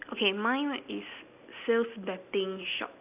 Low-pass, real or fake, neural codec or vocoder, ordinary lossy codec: 3.6 kHz; real; none; AAC, 32 kbps